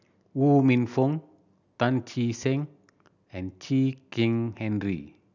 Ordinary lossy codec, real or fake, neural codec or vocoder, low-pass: none; real; none; 7.2 kHz